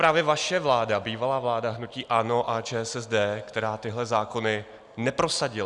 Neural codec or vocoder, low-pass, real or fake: none; 10.8 kHz; real